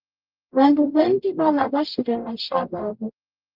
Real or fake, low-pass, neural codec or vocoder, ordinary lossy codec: fake; 5.4 kHz; codec, 44.1 kHz, 0.9 kbps, DAC; Opus, 32 kbps